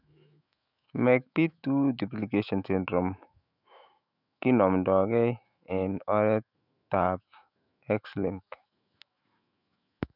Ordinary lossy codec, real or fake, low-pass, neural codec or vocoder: none; fake; 5.4 kHz; autoencoder, 48 kHz, 128 numbers a frame, DAC-VAE, trained on Japanese speech